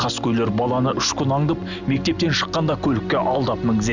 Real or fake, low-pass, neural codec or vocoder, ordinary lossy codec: real; 7.2 kHz; none; none